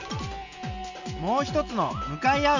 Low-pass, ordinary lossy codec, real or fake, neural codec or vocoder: 7.2 kHz; none; real; none